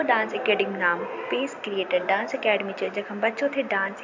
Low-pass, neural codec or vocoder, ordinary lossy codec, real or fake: 7.2 kHz; none; MP3, 48 kbps; real